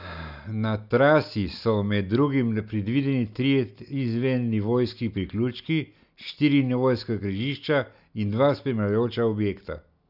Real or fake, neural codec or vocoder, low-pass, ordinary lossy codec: real; none; 5.4 kHz; none